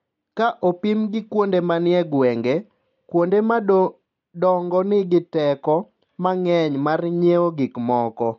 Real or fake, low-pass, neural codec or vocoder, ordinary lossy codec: real; 7.2 kHz; none; MP3, 48 kbps